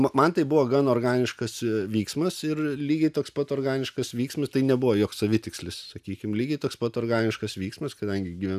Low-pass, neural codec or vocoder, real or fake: 14.4 kHz; none; real